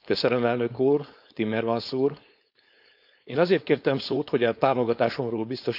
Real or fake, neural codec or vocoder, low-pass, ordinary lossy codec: fake; codec, 16 kHz, 4.8 kbps, FACodec; 5.4 kHz; none